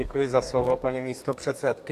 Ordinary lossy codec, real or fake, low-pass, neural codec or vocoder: AAC, 64 kbps; fake; 14.4 kHz; codec, 32 kHz, 1.9 kbps, SNAC